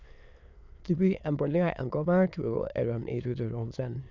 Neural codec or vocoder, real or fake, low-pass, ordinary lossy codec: autoencoder, 22.05 kHz, a latent of 192 numbers a frame, VITS, trained on many speakers; fake; 7.2 kHz; none